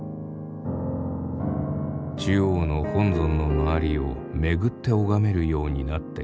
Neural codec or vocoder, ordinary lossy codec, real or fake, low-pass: none; none; real; none